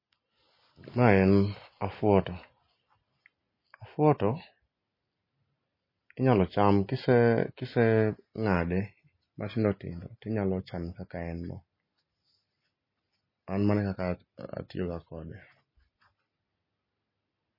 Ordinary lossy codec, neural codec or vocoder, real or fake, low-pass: MP3, 24 kbps; none; real; 5.4 kHz